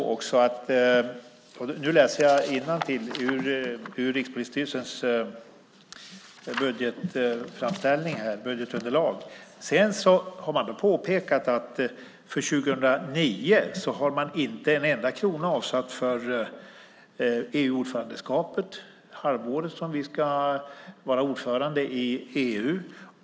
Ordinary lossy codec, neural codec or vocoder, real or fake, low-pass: none; none; real; none